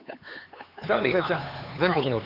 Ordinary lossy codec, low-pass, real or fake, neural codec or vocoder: none; 5.4 kHz; fake; codec, 16 kHz, 4 kbps, X-Codec, HuBERT features, trained on LibriSpeech